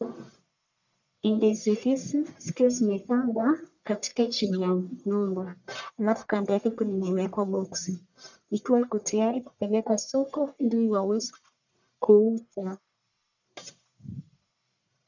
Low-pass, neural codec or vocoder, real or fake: 7.2 kHz; codec, 44.1 kHz, 1.7 kbps, Pupu-Codec; fake